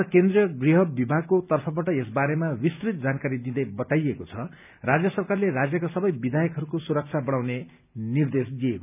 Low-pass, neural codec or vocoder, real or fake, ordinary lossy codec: 3.6 kHz; none; real; none